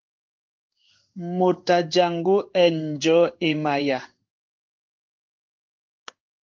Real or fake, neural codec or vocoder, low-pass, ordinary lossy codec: fake; codec, 16 kHz in and 24 kHz out, 1 kbps, XY-Tokenizer; 7.2 kHz; Opus, 24 kbps